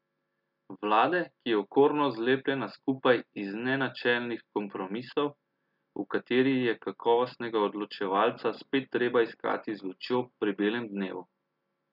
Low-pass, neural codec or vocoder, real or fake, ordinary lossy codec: 5.4 kHz; none; real; none